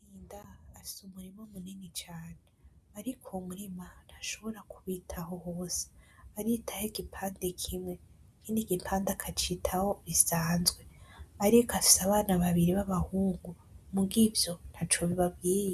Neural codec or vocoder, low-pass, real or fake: none; 14.4 kHz; real